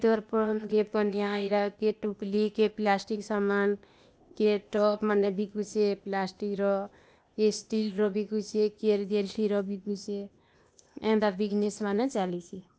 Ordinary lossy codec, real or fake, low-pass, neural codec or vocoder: none; fake; none; codec, 16 kHz, 0.8 kbps, ZipCodec